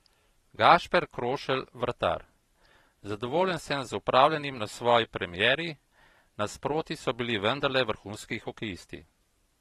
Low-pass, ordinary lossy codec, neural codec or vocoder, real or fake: 19.8 kHz; AAC, 32 kbps; vocoder, 44.1 kHz, 128 mel bands every 512 samples, BigVGAN v2; fake